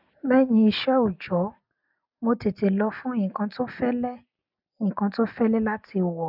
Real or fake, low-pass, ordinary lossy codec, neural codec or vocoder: real; 5.4 kHz; none; none